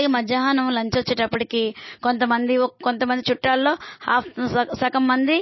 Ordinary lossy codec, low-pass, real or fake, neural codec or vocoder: MP3, 24 kbps; 7.2 kHz; real; none